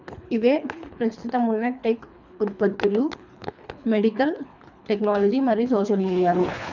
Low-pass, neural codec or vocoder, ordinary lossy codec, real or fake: 7.2 kHz; codec, 24 kHz, 3 kbps, HILCodec; none; fake